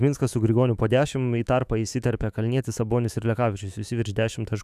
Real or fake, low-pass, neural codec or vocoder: fake; 14.4 kHz; autoencoder, 48 kHz, 128 numbers a frame, DAC-VAE, trained on Japanese speech